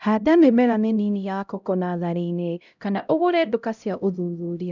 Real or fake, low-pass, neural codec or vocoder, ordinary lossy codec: fake; 7.2 kHz; codec, 16 kHz, 0.5 kbps, X-Codec, HuBERT features, trained on LibriSpeech; none